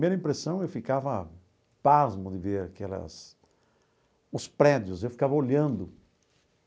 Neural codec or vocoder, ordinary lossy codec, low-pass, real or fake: none; none; none; real